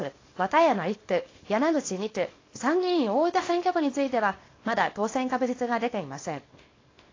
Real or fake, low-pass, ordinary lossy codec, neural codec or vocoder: fake; 7.2 kHz; AAC, 32 kbps; codec, 24 kHz, 0.9 kbps, WavTokenizer, small release